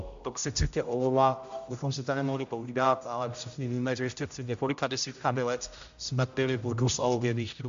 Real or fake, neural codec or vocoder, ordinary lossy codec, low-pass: fake; codec, 16 kHz, 0.5 kbps, X-Codec, HuBERT features, trained on general audio; AAC, 64 kbps; 7.2 kHz